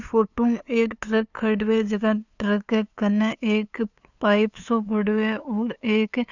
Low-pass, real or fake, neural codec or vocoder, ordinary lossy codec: 7.2 kHz; fake; codec, 16 kHz, 2 kbps, FunCodec, trained on LibriTTS, 25 frames a second; none